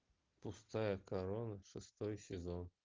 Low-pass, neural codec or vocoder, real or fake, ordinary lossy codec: 7.2 kHz; none; real; Opus, 32 kbps